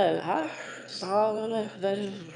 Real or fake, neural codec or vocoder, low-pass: fake; autoencoder, 22.05 kHz, a latent of 192 numbers a frame, VITS, trained on one speaker; 9.9 kHz